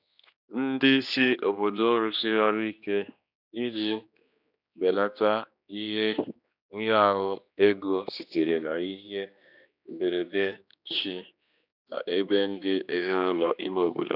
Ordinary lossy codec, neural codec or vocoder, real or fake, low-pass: none; codec, 16 kHz, 2 kbps, X-Codec, HuBERT features, trained on general audio; fake; 5.4 kHz